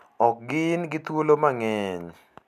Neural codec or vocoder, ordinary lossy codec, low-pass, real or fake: none; none; 14.4 kHz; real